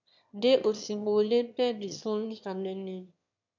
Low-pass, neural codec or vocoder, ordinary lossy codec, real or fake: 7.2 kHz; autoencoder, 22.05 kHz, a latent of 192 numbers a frame, VITS, trained on one speaker; MP3, 64 kbps; fake